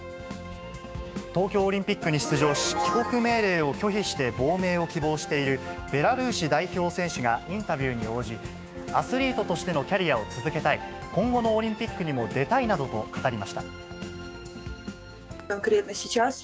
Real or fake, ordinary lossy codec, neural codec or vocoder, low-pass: fake; none; codec, 16 kHz, 6 kbps, DAC; none